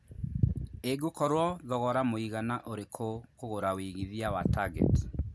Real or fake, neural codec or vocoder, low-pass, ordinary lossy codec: real; none; none; none